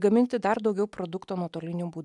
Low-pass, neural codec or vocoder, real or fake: 10.8 kHz; none; real